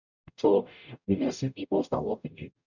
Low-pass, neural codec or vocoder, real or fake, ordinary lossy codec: 7.2 kHz; codec, 44.1 kHz, 0.9 kbps, DAC; fake; none